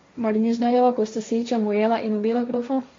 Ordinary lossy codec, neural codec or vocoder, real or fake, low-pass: MP3, 48 kbps; codec, 16 kHz, 1.1 kbps, Voila-Tokenizer; fake; 7.2 kHz